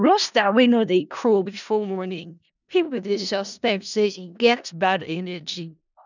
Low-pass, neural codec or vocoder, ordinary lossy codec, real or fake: 7.2 kHz; codec, 16 kHz in and 24 kHz out, 0.4 kbps, LongCat-Audio-Codec, four codebook decoder; none; fake